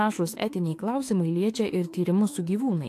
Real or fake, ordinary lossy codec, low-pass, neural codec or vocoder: fake; AAC, 64 kbps; 14.4 kHz; autoencoder, 48 kHz, 32 numbers a frame, DAC-VAE, trained on Japanese speech